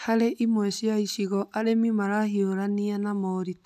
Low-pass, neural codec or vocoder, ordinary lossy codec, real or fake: 14.4 kHz; none; none; real